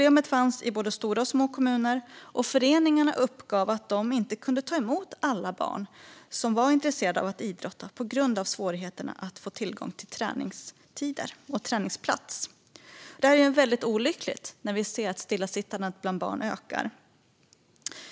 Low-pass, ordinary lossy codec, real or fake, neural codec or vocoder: none; none; real; none